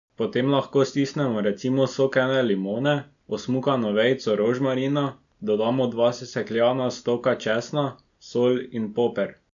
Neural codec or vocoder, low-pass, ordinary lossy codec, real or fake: none; 7.2 kHz; none; real